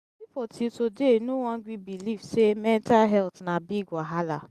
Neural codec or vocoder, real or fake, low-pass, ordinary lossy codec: none; real; 14.4 kHz; Opus, 64 kbps